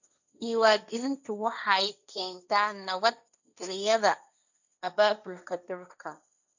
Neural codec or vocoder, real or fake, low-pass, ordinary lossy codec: codec, 16 kHz, 1.1 kbps, Voila-Tokenizer; fake; 7.2 kHz; none